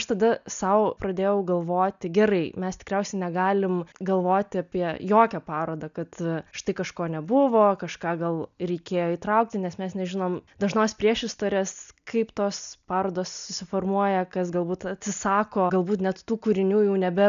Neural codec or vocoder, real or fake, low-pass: none; real; 7.2 kHz